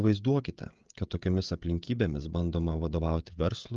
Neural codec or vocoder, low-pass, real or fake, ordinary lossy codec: codec, 16 kHz, 16 kbps, FreqCodec, smaller model; 7.2 kHz; fake; Opus, 32 kbps